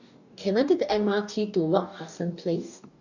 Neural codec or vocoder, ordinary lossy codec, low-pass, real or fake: codec, 44.1 kHz, 2.6 kbps, DAC; none; 7.2 kHz; fake